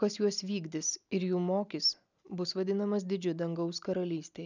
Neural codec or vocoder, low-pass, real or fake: none; 7.2 kHz; real